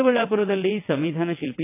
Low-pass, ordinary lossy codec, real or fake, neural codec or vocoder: 3.6 kHz; AAC, 24 kbps; fake; vocoder, 22.05 kHz, 80 mel bands, WaveNeXt